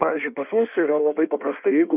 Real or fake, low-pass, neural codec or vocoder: fake; 3.6 kHz; codec, 16 kHz in and 24 kHz out, 1.1 kbps, FireRedTTS-2 codec